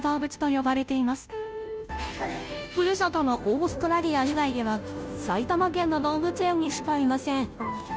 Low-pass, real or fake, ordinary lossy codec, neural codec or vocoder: none; fake; none; codec, 16 kHz, 0.5 kbps, FunCodec, trained on Chinese and English, 25 frames a second